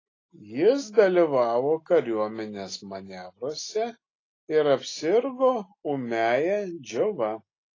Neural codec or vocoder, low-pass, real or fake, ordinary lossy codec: none; 7.2 kHz; real; AAC, 32 kbps